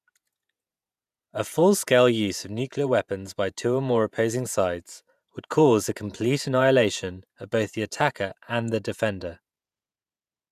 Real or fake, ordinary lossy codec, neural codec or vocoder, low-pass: fake; none; vocoder, 48 kHz, 128 mel bands, Vocos; 14.4 kHz